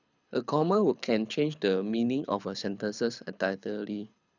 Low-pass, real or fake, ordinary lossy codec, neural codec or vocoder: 7.2 kHz; fake; none; codec, 24 kHz, 6 kbps, HILCodec